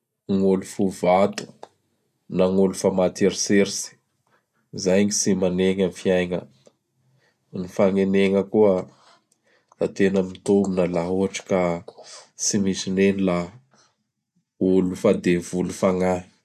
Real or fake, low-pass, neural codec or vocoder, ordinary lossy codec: real; 14.4 kHz; none; none